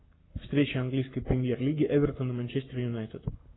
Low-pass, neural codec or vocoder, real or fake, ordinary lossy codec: 7.2 kHz; codec, 44.1 kHz, 7.8 kbps, Pupu-Codec; fake; AAC, 16 kbps